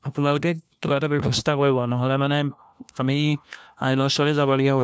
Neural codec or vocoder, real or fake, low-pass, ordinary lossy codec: codec, 16 kHz, 1 kbps, FunCodec, trained on LibriTTS, 50 frames a second; fake; none; none